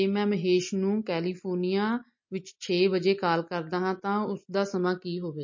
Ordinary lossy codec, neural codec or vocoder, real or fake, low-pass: MP3, 32 kbps; none; real; 7.2 kHz